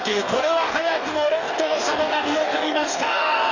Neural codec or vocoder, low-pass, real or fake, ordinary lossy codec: codec, 44.1 kHz, 2.6 kbps, DAC; 7.2 kHz; fake; none